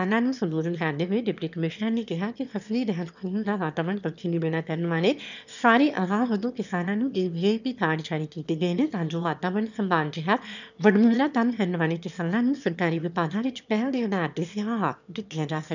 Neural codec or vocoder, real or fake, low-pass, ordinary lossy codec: autoencoder, 22.05 kHz, a latent of 192 numbers a frame, VITS, trained on one speaker; fake; 7.2 kHz; none